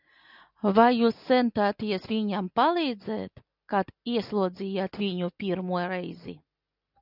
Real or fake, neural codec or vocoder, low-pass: real; none; 5.4 kHz